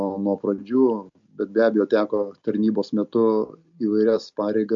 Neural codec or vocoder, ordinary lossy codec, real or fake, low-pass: none; MP3, 48 kbps; real; 7.2 kHz